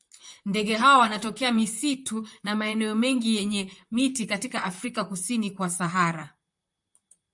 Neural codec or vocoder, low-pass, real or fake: vocoder, 44.1 kHz, 128 mel bands, Pupu-Vocoder; 10.8 kHz; fake